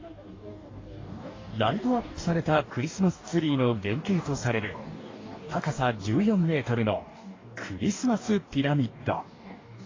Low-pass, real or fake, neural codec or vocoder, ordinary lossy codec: 7.2 kHz; fake; codec, 44.1 kHz, 2.6 kbps, DAC; AAC, 32 kbps